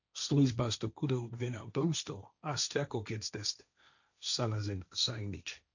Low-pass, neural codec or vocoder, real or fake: 7.2 kHz; codec, 16 kHz, 1.1 kbps, Voila-Tokenizer; fake